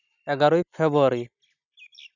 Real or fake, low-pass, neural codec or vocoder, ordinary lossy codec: real; 7.2 kHz; none; none